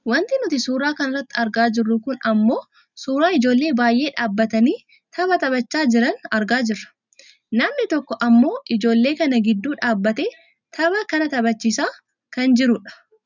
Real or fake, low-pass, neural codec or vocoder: real; 7.2 kHz; none